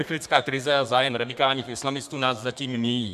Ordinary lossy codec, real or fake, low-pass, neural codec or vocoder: MP3, 96 kbps; fake; 14.4 kHz; codec, 32 kHz, 1.9 kbps, SNAC